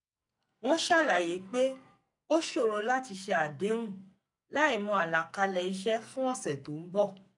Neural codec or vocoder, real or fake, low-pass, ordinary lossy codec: codec, 44.1 kHz, 2.6 kbps, SNAC; fake; 10.8 kHz; none